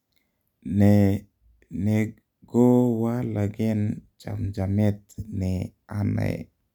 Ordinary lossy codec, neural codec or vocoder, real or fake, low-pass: none; none; real; 19.8 kHz